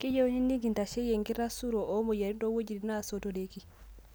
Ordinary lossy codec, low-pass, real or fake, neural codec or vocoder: none; none; real; none